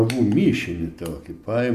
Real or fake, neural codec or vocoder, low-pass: real; none; 14.4 kHz